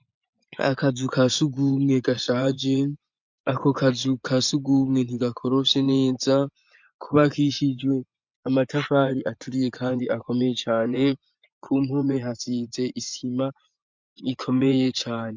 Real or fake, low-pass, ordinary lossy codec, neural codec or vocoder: fake; 7.2 kHz; MP3, 64 kbps; vocoder, 24 kHz, 100 mel bands, Vocos